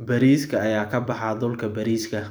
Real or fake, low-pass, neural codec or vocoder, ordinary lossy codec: fake; none; vocoder, 44.1 kHz, 128 mel bands every 256 samples, BigVGAN v2; none